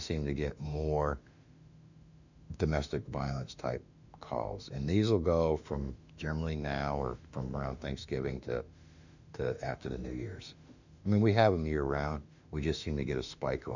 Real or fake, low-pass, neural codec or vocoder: fake; 7.2 kHz; autoencoder, 48 kHz, 32 numbers a frame, DAC-VAE, trained on Japanese speech